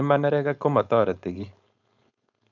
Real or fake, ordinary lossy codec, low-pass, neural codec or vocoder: fake; none; 7.2 kHz; codec, 16 kHz, 4.8 kbps, FACodec